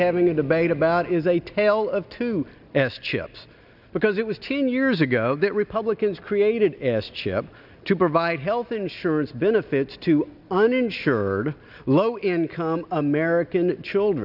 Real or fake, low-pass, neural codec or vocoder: real; 5.4 kHz; none